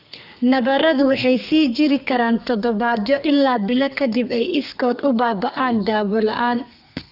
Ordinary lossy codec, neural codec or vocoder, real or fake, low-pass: none; codec, 32 kHz, 1.9 kbps, SNAC; fake; 5.4 kHz